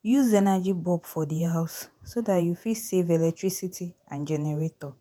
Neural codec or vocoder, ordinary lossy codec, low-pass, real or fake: vocoder, 48 kHz, 128 mel bands, Vocos; none; 19.8 kHz; fake